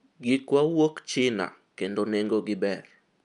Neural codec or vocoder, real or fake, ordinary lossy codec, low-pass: none; real; none; 10.8 kHz